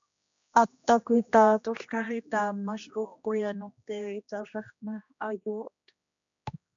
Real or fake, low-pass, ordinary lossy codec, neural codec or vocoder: fake; 7.2 kHz; MP3, 64 kbps; codec, 16 kHz, 1 kbps, X-Codec, HuBERT features, trained on general audio